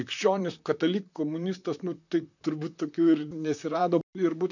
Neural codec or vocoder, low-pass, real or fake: vocoder, 44.1 kHz, 128 mel bands, Pupu-Vocoder; 7.2 kHz; fake